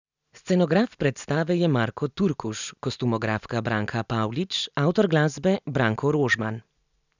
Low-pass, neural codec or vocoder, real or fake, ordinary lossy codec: 7.2 kHz; none; real; none